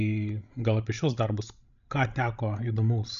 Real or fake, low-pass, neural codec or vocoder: fake; 7.2 kHz; codec, 16 kHz, 16 kbps, FreqCodec, larger model